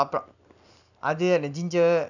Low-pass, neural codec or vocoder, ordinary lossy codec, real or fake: 7.2 kHz; none; none; real